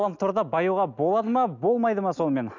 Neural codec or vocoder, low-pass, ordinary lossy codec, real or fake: none; 7.2 kHz; none; real